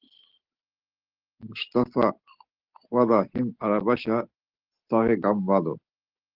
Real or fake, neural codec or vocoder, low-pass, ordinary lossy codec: real; none; 5.4 kHz; Opus, 16 kbps